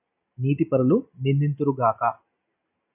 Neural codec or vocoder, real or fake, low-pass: none; real; 3.6 kHz